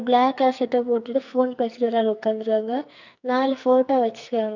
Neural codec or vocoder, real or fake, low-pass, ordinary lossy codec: codec, 44.1 kHz, 2.6 kbps, SNAC; fake; 7.2 kHz; none